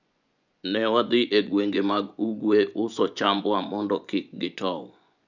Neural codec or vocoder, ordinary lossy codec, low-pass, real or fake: vocoder, 24 kHz, 100 mel bands, Vocos; none; 7.2 kHz; fake